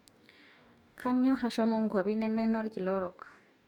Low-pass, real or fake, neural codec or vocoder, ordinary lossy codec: 19.8 kHz; fake; codec, 44.1 kHz, 2.6 kbps, DAC; none